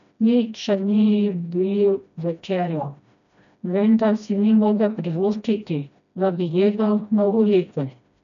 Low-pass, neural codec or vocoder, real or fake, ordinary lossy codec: 7.2 kHz; codec, 16 kHz, 1 kbps, FreqCodec, smaller model; fake; none